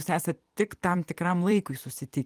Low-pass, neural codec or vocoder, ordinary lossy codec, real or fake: 14.4 kHz; vocoder, 44.1 kHz, 128 mel bands every 256 samples, BigVGAN v2; Opus, 24 kbps; fake